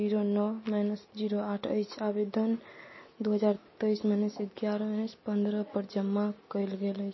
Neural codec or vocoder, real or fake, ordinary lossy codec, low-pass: none; real; MP3, 24 kbps; 7.2 kHz